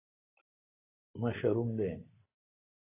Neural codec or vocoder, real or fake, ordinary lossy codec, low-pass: none; real; AAC, 16 kbps; 3.6 kHz